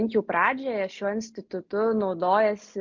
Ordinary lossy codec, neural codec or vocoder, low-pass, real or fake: MP3, 48 kbps; none; 7.2 kHz; real